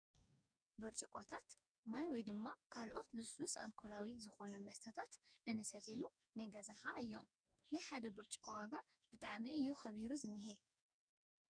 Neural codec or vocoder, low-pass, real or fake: codec, 44.1 kHz, 2.6 kbps, DAC; 9.9 kHz; fake